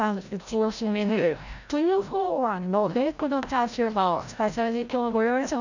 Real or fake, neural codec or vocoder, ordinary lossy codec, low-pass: fake; codec, 16 kHz, 0.5 kbps, FreqCodec, larger model; none; 7.2 kHz